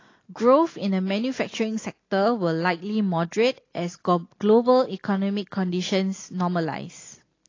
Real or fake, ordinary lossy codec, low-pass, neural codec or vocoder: real; AAC, 32 kbps; 7.2 kHz; none